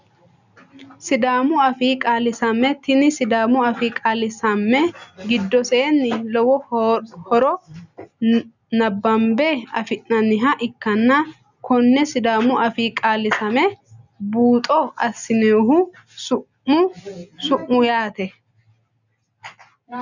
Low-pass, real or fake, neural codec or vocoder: 7.2 kHz; real; none